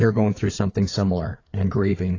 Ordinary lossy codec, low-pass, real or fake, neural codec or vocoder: AAC, 32 kbps; 7.2 kHz; fake; codec, 16 kHz, 4 kbps, FreqCodec, larger model